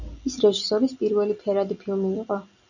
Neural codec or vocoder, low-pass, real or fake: none; 7.2 kHz; real